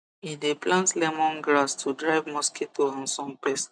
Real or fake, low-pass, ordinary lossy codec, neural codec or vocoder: real; 9.9 kHz; none; none